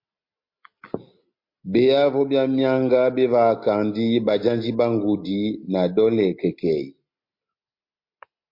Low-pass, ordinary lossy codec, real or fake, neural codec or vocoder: 5.4 kHz; MP3, 32 kbps; real; none